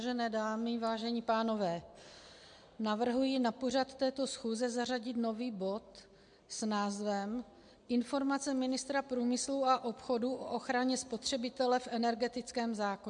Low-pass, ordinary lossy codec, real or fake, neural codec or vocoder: 9.9 kHz; MP3, 64 kbps; real; none